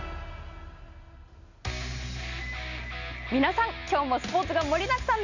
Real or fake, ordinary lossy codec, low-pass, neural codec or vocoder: real; none; 7.2 kHz; none